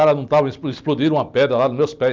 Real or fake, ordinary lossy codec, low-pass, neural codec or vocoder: real; Opus, 24 kbps; 7.2 kHz; none